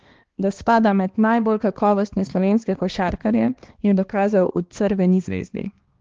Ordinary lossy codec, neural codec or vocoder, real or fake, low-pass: Opus, 16 kbps; codec, 16 kHz, 2 kbps, X-Codec, HuBERT features, trained on balanced general audio; fake; 7.2 kHz